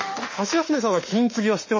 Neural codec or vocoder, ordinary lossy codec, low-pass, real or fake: autoencoder, 48 kHz, 32 numbers a frame, DAC-VAE, trained on Japanese speech; MP3, 32 kbps; 7.2 kHz; fake